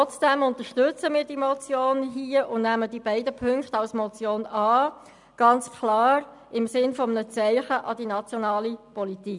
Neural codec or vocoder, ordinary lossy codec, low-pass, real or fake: none; none; 10.8 kHz; real